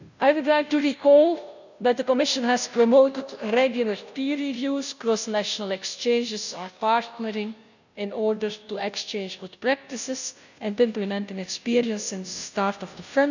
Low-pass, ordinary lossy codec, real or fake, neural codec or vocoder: 7.2 kHz; none; fake; codec, 16 kHz, 0.5 kbps, FunCodec, trained on Chinese and English, 25 frames a second